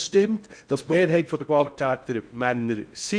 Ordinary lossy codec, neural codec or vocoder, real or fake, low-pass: none; codec, 16 kHz in and 24 kHz out, 0.6 kbps, FocalCodec, streaming, 4096 codes; fake; 9.9 kHz